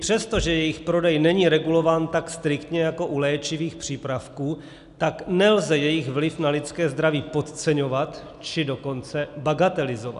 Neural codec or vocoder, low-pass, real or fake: none; 10.8 kHz; real